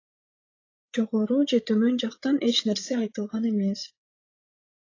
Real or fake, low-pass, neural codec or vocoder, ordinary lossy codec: fake; 7.2 kHz; codec, 16 kHz, 16 kbps, FreqCodec, larger model; AAC, 32 kbps